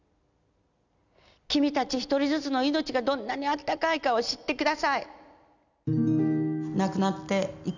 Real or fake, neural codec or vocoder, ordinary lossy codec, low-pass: real; none; none; 7.2 kHz